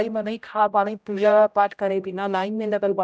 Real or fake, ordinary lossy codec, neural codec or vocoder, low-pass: fake; none; codec, 16 kHz, 0.5 kbps, X-Codec, HuBERT features, trained on general audio; none